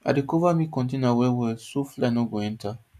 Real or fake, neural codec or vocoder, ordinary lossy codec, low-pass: real; none; none; 14.4 kHz